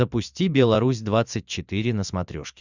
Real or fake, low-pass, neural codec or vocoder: fake; 7.2 kHz; vocoder, 44.1 kHz, 128 mel bands every 512 samples, BigVGAN v2